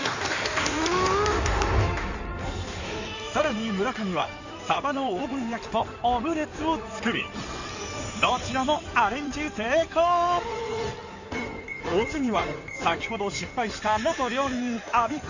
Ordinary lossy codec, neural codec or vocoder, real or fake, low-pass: none; codec, 16 kHz in and 24 kHz out, 2.2 kbps, FireRedTTS-2 codec; fake; 7.2 kHz